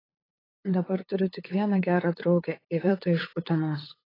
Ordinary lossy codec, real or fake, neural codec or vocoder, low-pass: AAC, 24 kbps; fake; codec, 16 kHz, 8 kbps, FunCodec, trained on LibriTTS, 25 frames a second; 5.4 kHz